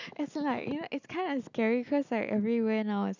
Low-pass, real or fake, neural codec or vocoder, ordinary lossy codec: 7.2 kHz; real; none; none